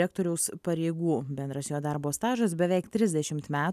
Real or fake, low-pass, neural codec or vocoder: real; 14.4 kHz; none